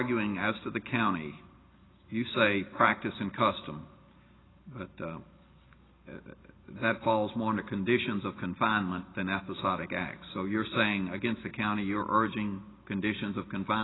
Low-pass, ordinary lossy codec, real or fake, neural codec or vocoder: 7.2 kHz; AAC, 16 kbps; real; none